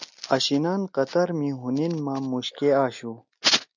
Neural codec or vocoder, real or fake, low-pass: none; real; 7.2 kHz